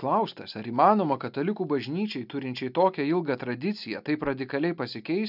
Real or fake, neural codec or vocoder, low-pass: real; none; 5.4 kHz